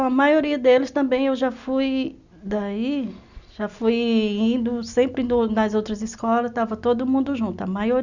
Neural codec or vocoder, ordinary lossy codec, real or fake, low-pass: none; none; real; 7.2 kHz